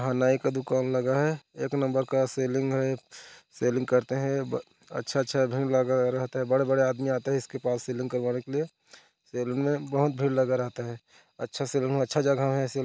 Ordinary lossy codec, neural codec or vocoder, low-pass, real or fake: none; none; none; real